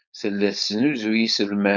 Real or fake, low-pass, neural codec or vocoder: fake; 7.2 kHz; codec, 16 kHz, 4.8 kbps, FACodec